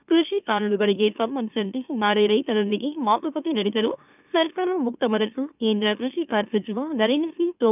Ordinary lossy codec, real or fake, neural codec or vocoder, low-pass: none; fake; autoencoder, 44.1 kHz, a latent of 192 numbers a frame, MeloTTS; 3.6 kHz